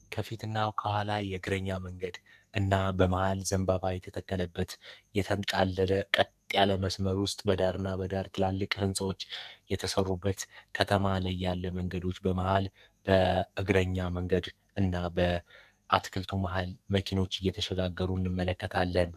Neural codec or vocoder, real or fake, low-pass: codec, 44.1 kHz, 2.6 kbps, SNAC; fake; 14.4 kHz